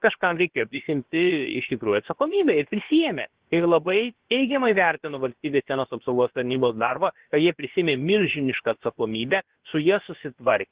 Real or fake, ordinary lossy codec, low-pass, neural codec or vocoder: fake; Opus, 16 kbps; 3.6 kHz; codec, 16 kHz, about 1 kbps, DyCAST, with the encoder's durations